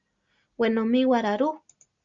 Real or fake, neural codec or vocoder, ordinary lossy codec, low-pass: real; none; Opus, 64 kbps; 7.2 kHz